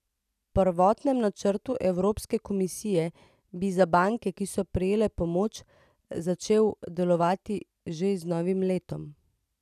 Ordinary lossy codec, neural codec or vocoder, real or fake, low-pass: none; none; real; 14.4 kHz